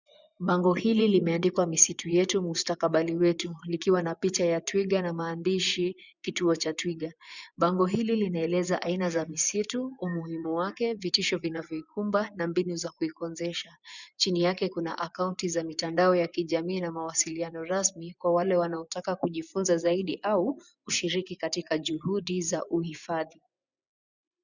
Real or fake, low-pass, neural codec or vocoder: fake; 7.2 kHz; vocoder, 24 kHz, 100 mel bands, Vocos